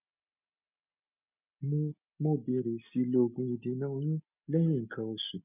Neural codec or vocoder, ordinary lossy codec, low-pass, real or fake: none; none; 3.6 kHz; real